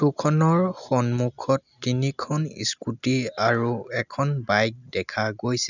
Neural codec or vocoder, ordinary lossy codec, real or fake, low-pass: none; none; real; 7.2 kHz